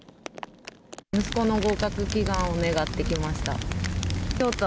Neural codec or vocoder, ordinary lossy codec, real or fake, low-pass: none; none; real; none